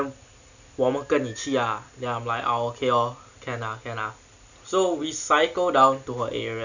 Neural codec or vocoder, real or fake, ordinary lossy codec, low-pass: none; real; none; 7.2 kHz